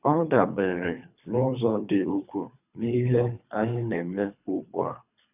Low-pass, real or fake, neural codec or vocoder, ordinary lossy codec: 3.6 kHz; fake; codec, 24 kHz, 3 kbps, HILCodec; none